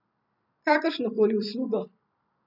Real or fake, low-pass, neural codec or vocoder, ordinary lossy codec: fake; 5.4 kHz; vocoder, 44.1 kHz, 128 mel bands every 256 samples, BigVGAN v2; none